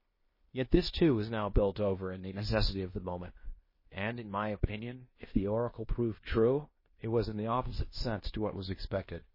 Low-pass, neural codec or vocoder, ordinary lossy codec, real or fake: 5.4 kHz; codec, 16 kHz in and 24 kHz out, 0.9 kbps, LongCat-Audio-Codec, fine tuned four codebook decoder; MP3, 24 kbps; fake